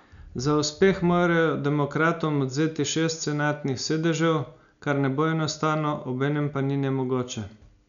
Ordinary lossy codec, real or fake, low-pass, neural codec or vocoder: none; real; 7.2 kHz; none